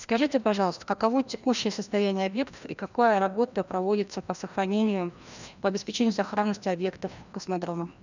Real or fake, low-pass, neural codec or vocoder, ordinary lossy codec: fake; 7.2 kHz; codec, 16 kHz, 1 kbps, FreqCodec, larger model; none